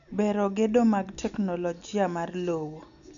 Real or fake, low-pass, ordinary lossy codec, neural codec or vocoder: real; 7.2 kHz; none; none